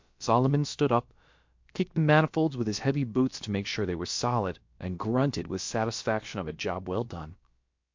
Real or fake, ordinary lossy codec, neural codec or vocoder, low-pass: fake; MP3, 48 kbps; codec, 16 kHz, about 1 kbps, DyCAST, with the encoder's durations; 7.2 kHz